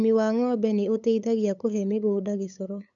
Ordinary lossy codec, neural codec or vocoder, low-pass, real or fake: none; codec, 16 kHz, 8 kbps, FunCodec, trained on LibriTTS, 25 frames a second; 7.2 kHz; fake